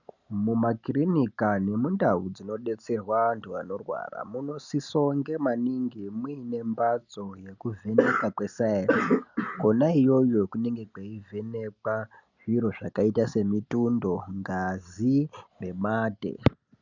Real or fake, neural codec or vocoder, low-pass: real; none; 7.2 kHz